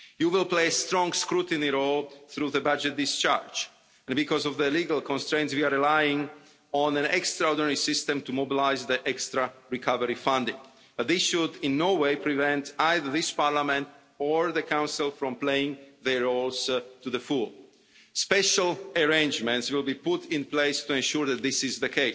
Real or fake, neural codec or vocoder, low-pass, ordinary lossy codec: real; none; none; none